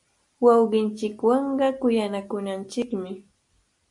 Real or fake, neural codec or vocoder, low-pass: real; none; 10.8 kHz